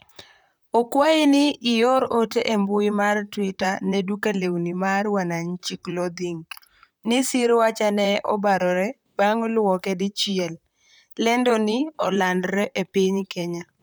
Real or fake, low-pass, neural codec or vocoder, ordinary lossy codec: fake; none; vocoder, 44.1 kHz, 128 mel bands, Pupu-Vocoder; none